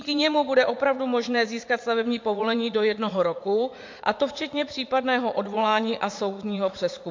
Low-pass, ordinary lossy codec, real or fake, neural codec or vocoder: 7.2 kHz; MP3, 48 kbps; fake; vocoder, 44.1 kHz, 80 mel bands, Vocos